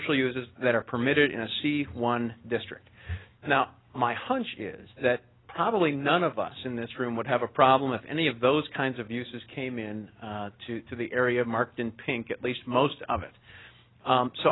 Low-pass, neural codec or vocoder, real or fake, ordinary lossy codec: 7.2 kHz; none; real; AAC, 16 kbps